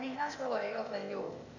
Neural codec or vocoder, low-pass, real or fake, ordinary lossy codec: codec, 16 kHz, 0.8 kbps, ZipCodec; 7.2 kHz; fake; none